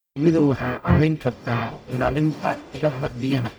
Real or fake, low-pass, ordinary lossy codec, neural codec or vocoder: fake; none; none; codec, 44.1 kHz, 0.9 kbps, DAC